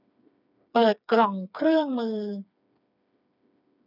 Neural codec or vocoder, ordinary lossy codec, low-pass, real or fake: codec, 16 kHz, 4 kbps, FreqCodec, smaller model; none; 5.4 kHz; fake